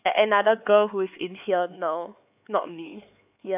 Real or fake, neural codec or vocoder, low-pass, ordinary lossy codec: fake; codec, 16 kHz, 4 kbps, X-Codec, HuBERT features, trained on LibriSpeech; 3.6 kHz; AAC, 32 kbps